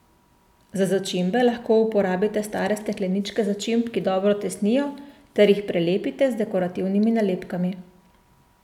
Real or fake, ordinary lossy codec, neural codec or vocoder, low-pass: real; none; none; 19.8 kHz